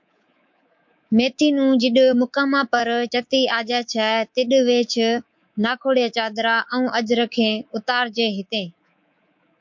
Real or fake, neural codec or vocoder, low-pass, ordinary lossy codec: fake; codec, 24 kHz, 3.1 kbps, DualCodec; 7.2 kHz; MP3, 48 kbps